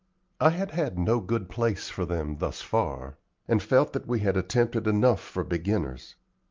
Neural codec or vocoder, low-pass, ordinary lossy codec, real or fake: none; 7.2 kHz; Opus, 24 kbps; real